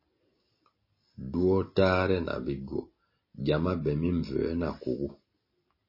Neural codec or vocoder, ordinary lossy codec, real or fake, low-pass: none; MP3, 24 kbps; real; 5.4 kHz